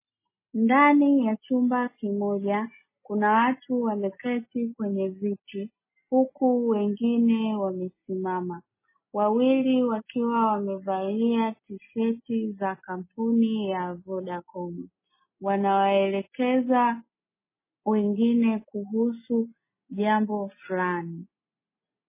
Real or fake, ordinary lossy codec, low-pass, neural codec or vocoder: real; MP3, 16 kbps; 3.6 kHz; none